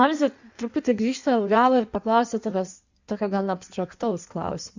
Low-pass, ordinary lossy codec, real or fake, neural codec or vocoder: 7.2 kHz; Opus, 64 kbps; fake; codec, 16 kHz in and 24 kHz out, 1.1 kbps, FireRedTTS-2 codec